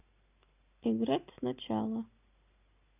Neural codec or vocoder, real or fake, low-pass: none; real; 3.6 kHz